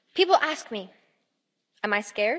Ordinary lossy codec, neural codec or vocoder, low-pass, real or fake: none; none; none; real